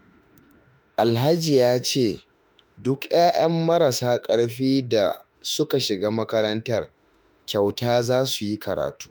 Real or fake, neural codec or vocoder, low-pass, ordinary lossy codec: fake; autoencoder, 48 kHz, 32 numbers a frame, DAC-VAE, trained on Japanese speech; none; none